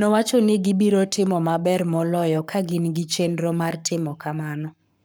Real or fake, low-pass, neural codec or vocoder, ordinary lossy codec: fake; none; codec, 44.1 kHz, 7.8 kbps, Pupu-Codec; none